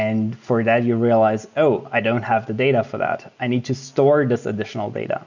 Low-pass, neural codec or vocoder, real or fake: 7.2 kHz; none; real